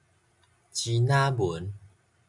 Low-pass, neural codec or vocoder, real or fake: 10.8 kHz; none; real